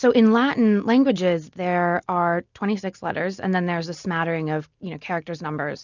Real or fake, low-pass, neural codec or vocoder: real; 7.2 kHz; none